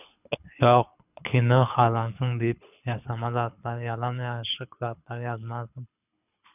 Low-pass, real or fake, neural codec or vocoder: 3.6 kHz; fake; codec, 24 kHz, 6 kbps, HILCodec